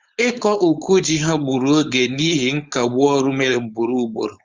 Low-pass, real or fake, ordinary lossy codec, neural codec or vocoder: 7.2 kHz; fake; Opus, 32 kbps; codec, 16 kHz, 4.8 kbps, FACodec